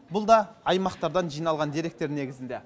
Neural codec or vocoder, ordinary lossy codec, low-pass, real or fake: none; none; none; real